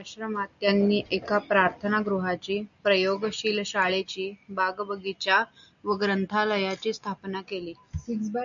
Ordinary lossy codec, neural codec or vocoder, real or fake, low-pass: MP3, 96 kbps; none; real; 7.2 kHz